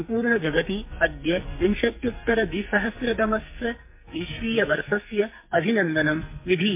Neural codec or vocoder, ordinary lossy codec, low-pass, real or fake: codec, 44.1 kHz, 2.6 kbps, SNAC; MP3, 24 kbps; 3.6 kHz; fake